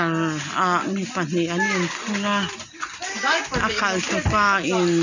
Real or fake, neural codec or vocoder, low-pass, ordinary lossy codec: real; none; 7.2 kHz; none